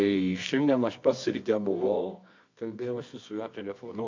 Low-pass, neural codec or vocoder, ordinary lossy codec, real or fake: 7.2 kHz; codec, 24 kHz, 0.9 kbps, WavTokenizer, medium music audio release; MP3, 48 kbps; fake